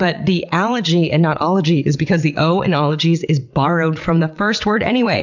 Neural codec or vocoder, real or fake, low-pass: vocoder, 22.05 kHz, 80 mel bands, WaveNeXt; fake; 7.2 kHz